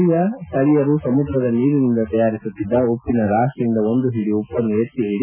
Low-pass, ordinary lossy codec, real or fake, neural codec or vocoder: 3.6 kHz; none; real; none